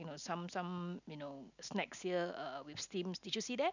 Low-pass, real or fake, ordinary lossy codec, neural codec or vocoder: 7.2 kHz; real; none; none